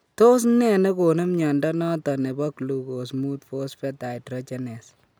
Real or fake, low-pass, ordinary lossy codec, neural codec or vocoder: real; none; none; none